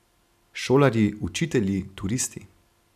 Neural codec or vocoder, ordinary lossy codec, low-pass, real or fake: none; none; 14.4 kHz; real